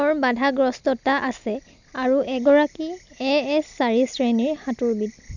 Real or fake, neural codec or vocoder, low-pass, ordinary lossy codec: real; none; 7.2 kHz; none